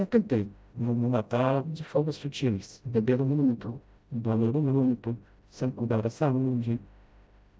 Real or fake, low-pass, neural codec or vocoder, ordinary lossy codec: fake; none; codec, 16 kHz, 0.5 kbps, FreqCodec, smaller model; none